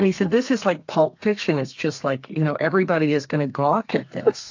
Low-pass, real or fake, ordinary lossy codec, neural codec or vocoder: 7.2 kHz; fake; AAC, 48 kbps; codec, 32 kHz, 1.9 kbps, SNAC